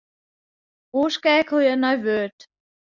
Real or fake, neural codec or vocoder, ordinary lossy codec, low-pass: real; none; Opus, 64 kbps; 7.2 kHz